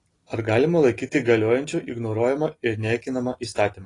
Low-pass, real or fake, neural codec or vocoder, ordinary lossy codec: 10.8 kHz; real; none; AAC, 32 kbps